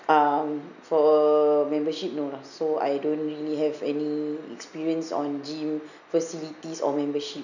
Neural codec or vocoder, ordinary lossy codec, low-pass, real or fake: none; none; 7.2 kHz; real